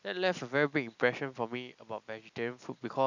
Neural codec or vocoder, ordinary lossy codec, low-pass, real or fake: none; none; 7.2 kHz; real